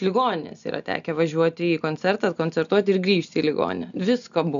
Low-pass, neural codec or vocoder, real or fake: 7.2 kHz; none; real